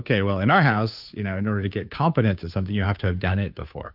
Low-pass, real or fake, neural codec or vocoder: 5.4 kHz; fake; codec, 16 kHz, 2 kbps, FunCodec, trained on Chinese and English, 25 frames a second